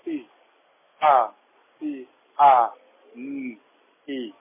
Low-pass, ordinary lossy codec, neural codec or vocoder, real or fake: 3.6 kHz; MP3, 16 kbps; none; real